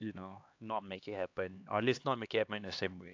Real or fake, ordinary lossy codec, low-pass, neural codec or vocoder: fake; AAC, 48 kbps; 7.2 kHz; codec, 16 kHz, 2 kbps, X-Codec, HuBERT features, trained on LibriSpeech